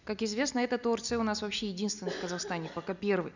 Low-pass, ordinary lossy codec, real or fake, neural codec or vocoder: 7.2 kHz; none; real; none